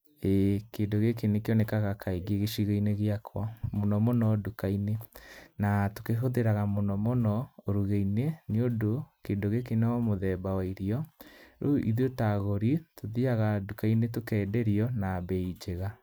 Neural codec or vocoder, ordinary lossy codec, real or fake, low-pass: none; none; real; none